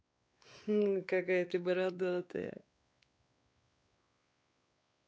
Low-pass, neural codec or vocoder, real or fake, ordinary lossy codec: none; codec, 16 kHz, 4 kbps, X-Codec, WavLM features, trained on Multilingual LibriSpeech; fake; none